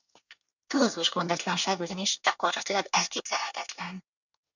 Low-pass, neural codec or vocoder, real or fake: 7.2 kHz; codec, 24 kHz, 1 kbps, SNAC; fake